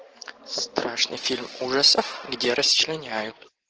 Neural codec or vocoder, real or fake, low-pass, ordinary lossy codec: vocoder, 44.1 kHz, 128 mel bands every 512 samples, BigVGAN v2; fake; 7.2 kHz; Opus, 16 kbps